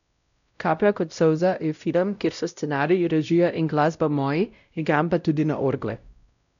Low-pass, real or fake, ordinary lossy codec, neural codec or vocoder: 7.2 kHz; fake; none; codec, 16 kHz, 0.5 kbps, X-Codec, WavLM features, trained on Multilingual LibriSpeech